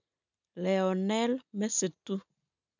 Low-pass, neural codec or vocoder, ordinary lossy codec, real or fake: 7.2 kHz; none; none; real